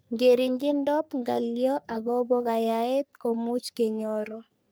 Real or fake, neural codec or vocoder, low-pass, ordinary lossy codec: fake; codec, 44.1 kHz, 3.4 kbps, Pupu-Codec; none; none